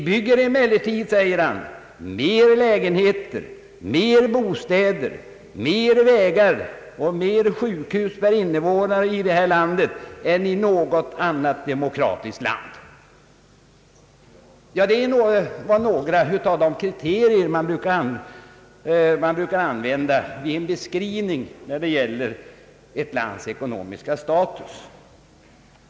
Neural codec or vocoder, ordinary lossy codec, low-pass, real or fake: none; none; none; real